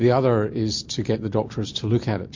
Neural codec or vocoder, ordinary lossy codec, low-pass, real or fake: none; MP3, 48 kbps; 7.2 kHz; real